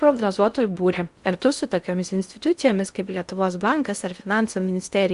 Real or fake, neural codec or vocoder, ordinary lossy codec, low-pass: fake; codec, 16 kHz in and 24 kHz out, 0.6 kbps, FocalCodec, streaming, 4096 codes; Opus, 64 kbps; 10.8 kHz